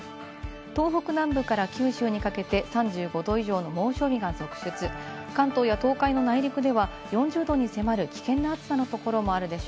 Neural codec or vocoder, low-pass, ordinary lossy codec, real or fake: none; none; none; real